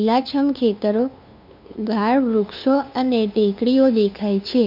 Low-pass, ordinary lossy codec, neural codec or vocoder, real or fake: 5.4 kHz; MP3, 48 kbps; codec, 16 kHz, 2 kbps, FunCodec, trained on LibriTTS, 25 frames a second; fake